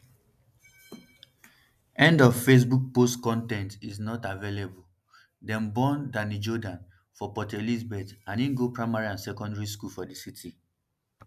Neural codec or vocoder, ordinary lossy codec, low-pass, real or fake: none; none; 14.4 kHz; real